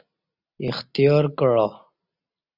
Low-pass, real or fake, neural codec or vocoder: 5.4 kHz; real; none